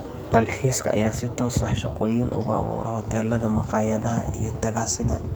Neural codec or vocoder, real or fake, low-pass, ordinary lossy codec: codec, 44.1 kHz, 2.6 kbps, SNAC; fake; none; none